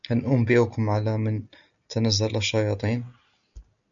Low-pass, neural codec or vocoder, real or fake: 7.2 kHz; none; real